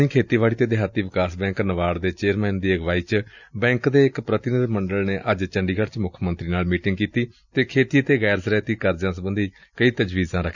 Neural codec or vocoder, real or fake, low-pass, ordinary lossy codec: none; real; 7.2 kHz; none